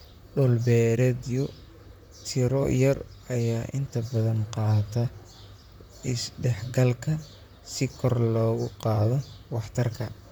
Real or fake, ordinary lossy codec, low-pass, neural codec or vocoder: fake; none; none; vocoder, 44.1 kHz, 128 mel bands, Pupu-Vocoder